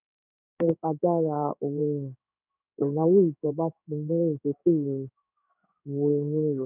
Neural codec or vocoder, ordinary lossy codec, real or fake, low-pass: codec, 16 kHz in and 24 kHz out, 1 kbps, XY-Tokenizer; none; fake; 3.6 kHz